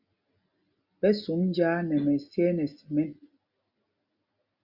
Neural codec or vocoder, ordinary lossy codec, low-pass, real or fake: none; Opus, 64 kbps; 5.4 kHz; real